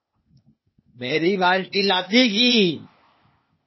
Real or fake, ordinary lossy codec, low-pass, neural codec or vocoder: fake; MP3, 24 kbps; 7.2 kHz; codec, 16 kHz, 0.8 kbps, ZipCodec